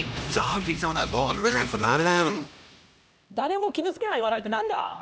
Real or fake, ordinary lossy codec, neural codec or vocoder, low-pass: fake; none; codec, 16 kHz, 1 kbps, X-Codec, HuBERT features, trained on LibriSpeech; none